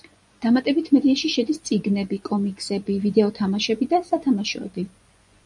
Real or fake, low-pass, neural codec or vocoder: fake; 10.8 kHz; vocoder, 24 kHz, 100 mel bands, Vocos